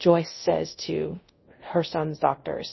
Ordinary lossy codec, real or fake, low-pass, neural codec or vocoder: MP3, 24 kbps; fake; 7.2 kHz; codec, 24 kHz, 0.5 kbps, DualCodec